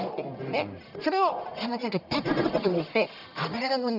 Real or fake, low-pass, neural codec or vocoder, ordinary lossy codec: fake; 5.4 kHz; codec, 44.1 kHz, 1.7 kbps, Pupu-Codec; none